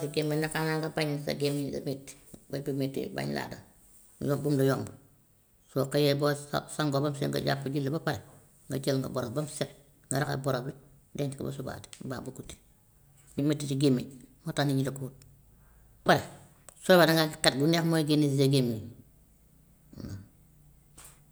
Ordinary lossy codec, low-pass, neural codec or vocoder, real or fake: none; none; none; real